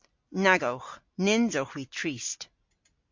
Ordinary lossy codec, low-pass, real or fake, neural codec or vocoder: MP3, 48 kbps; 7.2 kHz; real; none